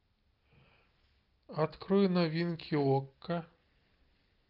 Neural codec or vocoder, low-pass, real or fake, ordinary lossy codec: none; 5.4 kHz; real; Opus, 32 kbps